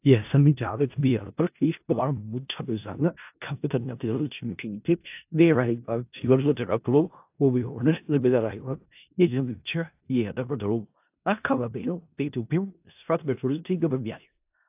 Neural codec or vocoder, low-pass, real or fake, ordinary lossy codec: codec, 16 kHz in and 24 kHz out, 0.4 kbps, LongCat-Audio-Codec, four codebook decoder; 3.6 kHz; fake; AAC, 32 kbps